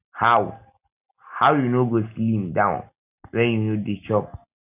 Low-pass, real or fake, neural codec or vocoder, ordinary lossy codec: 3.6 kHz; real; none; none